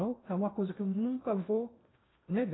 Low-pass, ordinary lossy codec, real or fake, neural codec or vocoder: 7.2 kHz; AAC, 16 kbps; fake; codec, 16 kHz in and 24 kHz out, 0.6 kbps, FocalCodec, streaming, 2048 codes